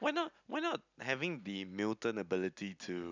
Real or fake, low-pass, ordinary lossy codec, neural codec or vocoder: real; 7.2 kHz; none; none